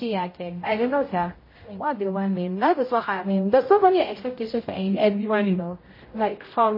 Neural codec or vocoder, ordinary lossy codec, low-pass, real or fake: codec, 16 kHz, 0.5 kbps, X-Codec, HuBERT features, trained on general audio; MP3, 24 kbps; 5.4 kHz; fake